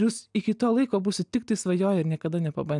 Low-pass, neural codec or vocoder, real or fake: 10.8 kHz; none; real